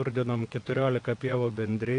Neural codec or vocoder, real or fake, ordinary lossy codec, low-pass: vocoder, 22.05 kHz, 80 mel bands, WaveNeXt; fake; AAC, 48 kbps; 9.9 kHz